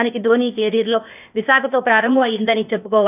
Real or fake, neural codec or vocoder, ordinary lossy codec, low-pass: fake; codec, 16 kHz, 0.8 kbps, ZipCodec; none; 3.6 kHz